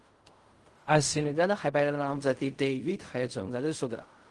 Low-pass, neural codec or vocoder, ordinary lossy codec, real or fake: 10.8 kHz; codec, 16 kHz in and 24 kHz out, 0.4 kbps, LongCat-Audio-Codec, fine tuned four codebook decoder; Opus, 24 kbps; fake